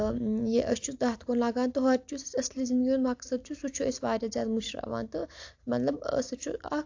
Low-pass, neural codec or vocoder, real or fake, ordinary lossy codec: 7.2 kHz; none; real; AAC, 48 kbps